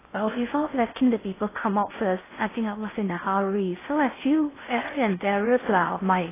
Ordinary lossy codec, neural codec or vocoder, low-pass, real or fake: AAC, 16 kbps; codec, 16 kHz in and 24 kHz out, 0.6 kbps, FocalCodec, streaming, 4096 codes; 3.6 kHz; fake